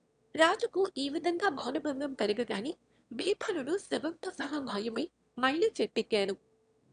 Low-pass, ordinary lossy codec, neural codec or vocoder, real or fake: 9.9 kHz; none; autoencoder, 22.05 kHz, a latent of 192 numbers a frame, VITS, trained on one speaker; fake